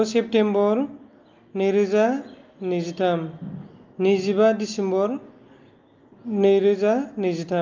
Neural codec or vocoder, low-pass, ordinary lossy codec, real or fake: none; 7.2 kHz; Opus, 24 kbps; real